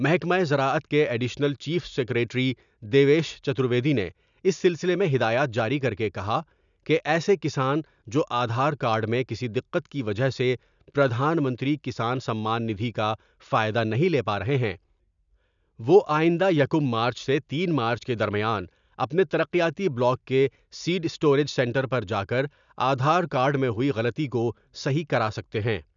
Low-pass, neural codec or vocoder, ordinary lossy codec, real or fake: 7.2 kHz; none; none; real